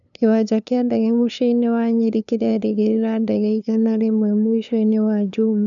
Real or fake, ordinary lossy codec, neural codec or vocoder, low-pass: fake; none; codec, 16 kHz, 2 kbps, FunCodec, trained on LibriTTS, 25 frames a second; 7.2 kHz